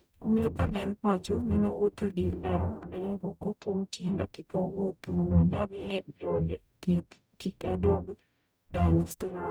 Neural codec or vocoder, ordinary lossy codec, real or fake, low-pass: codec, 44.1 kHz, 0.9 kbps, DAC; none; fake; none